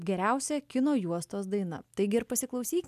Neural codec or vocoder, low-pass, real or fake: none; 14.4 kHz; real